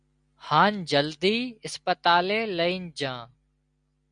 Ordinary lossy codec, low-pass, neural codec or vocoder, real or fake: MP3, 96 kbps; 9.9 kHz; none; real